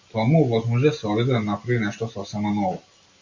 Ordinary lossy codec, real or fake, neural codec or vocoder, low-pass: MP3, 48 kbps; real; none; 7.2 kHz